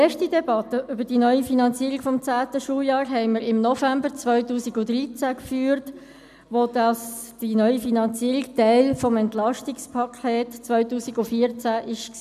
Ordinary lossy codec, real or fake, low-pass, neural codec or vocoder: none; real; 14.4 kHz; none